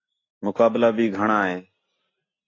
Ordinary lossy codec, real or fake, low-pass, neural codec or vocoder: AAC, 32 kbps; real; 7.2 kHz; none